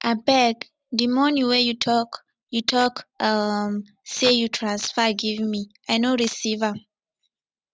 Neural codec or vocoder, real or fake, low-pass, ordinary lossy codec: none; real; none; none